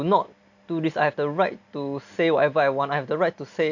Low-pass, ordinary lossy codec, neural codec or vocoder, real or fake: 7.2 kHz; none; none; real